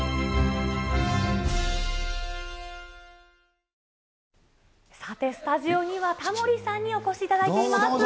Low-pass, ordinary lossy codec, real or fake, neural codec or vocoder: none; none; real; none